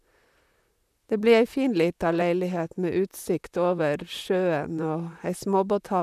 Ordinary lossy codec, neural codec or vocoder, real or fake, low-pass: none; vocoder, 44.1 kHz, 128 mel bands, Pupu-Vocoder; fake; 14.4 kHz